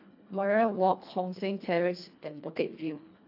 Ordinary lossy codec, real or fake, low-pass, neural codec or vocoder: AAC, 48 kbps; fake; 5.4 kHz; codec, 24 kHz, 1.5 kbps, HILCodec